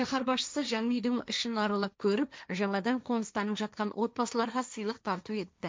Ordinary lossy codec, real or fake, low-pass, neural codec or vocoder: none; fake; none; codec, 16 kHz, 1.1 kbps, Voila-Tokenizer